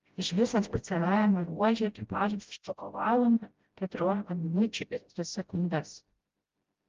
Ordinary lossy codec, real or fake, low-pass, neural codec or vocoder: Opus, 32 kbps; fake; 7.2 kHz; codec, 16 kHz, 0.5 kbps, FreqCodec, smaller model